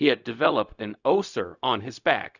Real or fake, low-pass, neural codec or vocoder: fake; 7.2 kHz; codec, 16 kHz, 0.4 kbps, LongCat-Audio-Codec